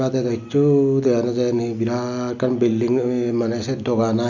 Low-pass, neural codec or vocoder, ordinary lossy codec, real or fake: 7.2 kHz; none; none; real